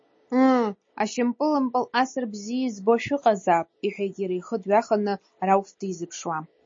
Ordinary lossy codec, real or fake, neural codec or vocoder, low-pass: MP3, 32 kbps; real; none; 7.2 kHz